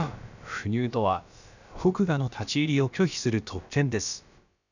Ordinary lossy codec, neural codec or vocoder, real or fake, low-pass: none; codec, 16 kHz, about 1 kbps, DyCAST, with the encoder's durations; fake; 7.2 kHz